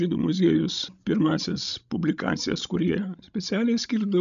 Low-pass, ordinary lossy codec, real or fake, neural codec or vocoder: 7.2 kHz; AAC, 96 kbps; fake; codec, 16 kHz, 16 kbps, FreqCodec, larger model